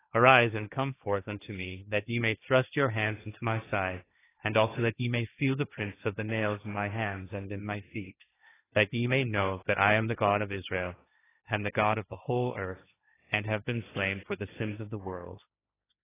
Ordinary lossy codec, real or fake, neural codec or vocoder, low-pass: AAC, 16 kbps; fake; codec, 16 kHz, 1.1 kbps, Voila-Tokenizer; 3.6 kHz